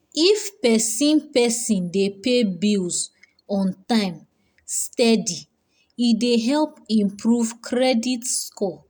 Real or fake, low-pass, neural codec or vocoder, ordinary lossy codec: real; none; none; none